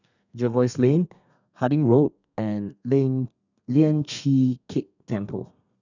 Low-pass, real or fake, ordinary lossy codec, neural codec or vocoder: 7.2 kHz; fake; none; codec, 32 kHz, 1.9 kbps, SNAC